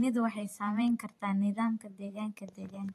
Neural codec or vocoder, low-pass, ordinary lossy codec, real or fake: vocoder, 44.1 kHz, 128 mel bands every 512 samples, BigVGAN v2; 14.4 kHz; AAC, 48 kbps; fake